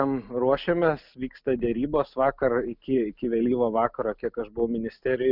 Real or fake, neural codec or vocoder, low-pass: real; none; 5.4 kHz